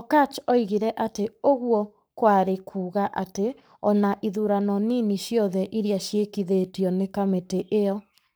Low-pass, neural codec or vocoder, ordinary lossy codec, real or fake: none; codec, 44.1 kHz, 7.8 kbps, Pupu-Codec; none; fake